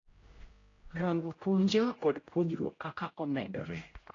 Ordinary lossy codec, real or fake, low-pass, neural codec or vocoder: AAC, 32 kbps; fake; 7.2 kHz; codec, 16 kHz, 0.5 kbps, X-Codec, HuBERT features, trained on general audio